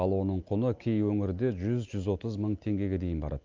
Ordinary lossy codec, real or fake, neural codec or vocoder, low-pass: Opus, 24 kbps; real; none; 7.2 kHz